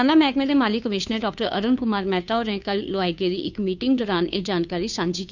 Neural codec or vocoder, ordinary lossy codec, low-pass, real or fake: codec, 16 kHz, 2 kbps, FunCodec, trained on Chinese and English, 25 frames a second; none; 7.2 kHz; fake